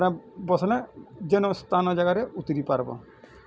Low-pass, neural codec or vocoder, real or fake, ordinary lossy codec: none; none; real; none